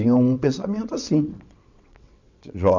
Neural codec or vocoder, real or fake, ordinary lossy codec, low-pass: vocoder, 22.05 kHz, 80 mel bands, WaveNeXt; fake; none; 7.2 kHz